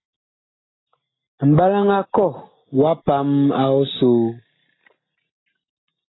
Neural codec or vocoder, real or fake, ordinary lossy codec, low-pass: none; real; AAC, 16 kbps; 7.2 kHz